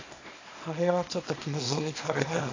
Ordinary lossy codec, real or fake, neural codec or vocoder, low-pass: AAC, 32 kbps; fake; codec, 24 kHz, 0.9 kbps, WavTokenizer, small release; 7.2 kHz